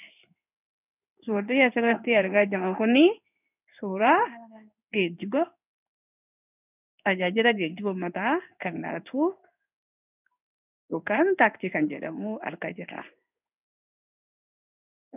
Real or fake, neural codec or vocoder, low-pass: fake; codec, 16 kHz in and 24 kHz out, 1 kbps, XY-Tokenizer; 3.6 kHz